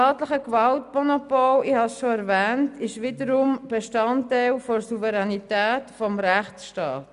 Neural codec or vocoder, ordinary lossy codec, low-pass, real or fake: none; none; 10.8 kHz; real